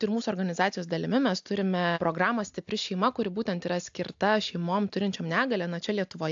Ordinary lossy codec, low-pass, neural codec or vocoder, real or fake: AAC, 64 kbps; 7.2 kHz; none; real